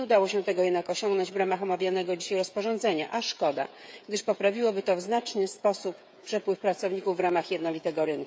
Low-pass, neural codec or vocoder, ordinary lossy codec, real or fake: none; codec, 16 kHz, 16 kbps, FreqCodec, smaller model; none; fake